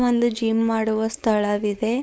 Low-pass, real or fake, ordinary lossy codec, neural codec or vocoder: none; fake; none; codec, 16 kHz, 4.8 kbps, FACodec